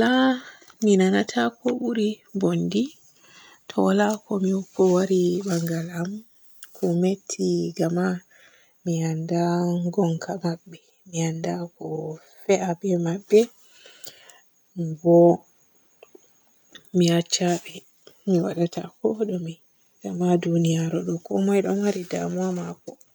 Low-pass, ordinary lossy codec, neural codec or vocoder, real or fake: none; none; none; real